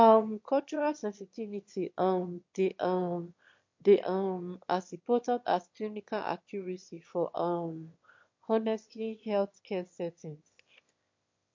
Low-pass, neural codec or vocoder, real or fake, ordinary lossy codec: 7.2 kHz; autoencoder, 22.05 kHz, a latent of 192 numbers a frame, VITS, trained on one speaker; fake; MP3, 48 kbps